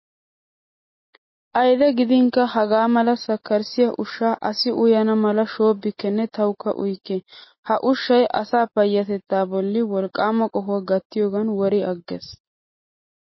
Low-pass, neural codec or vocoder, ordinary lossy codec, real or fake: 7.2 kHz; none; MP3, 24 kbps; real